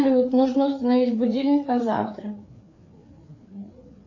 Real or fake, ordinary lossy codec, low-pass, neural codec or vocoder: fake; AAC, 48 kbps; 7.2 kHz; codec, 16 kHz, 4 kbps, FreqCodec, larger model